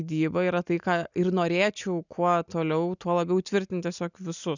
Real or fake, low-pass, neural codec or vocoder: real; 7.2 kHz; none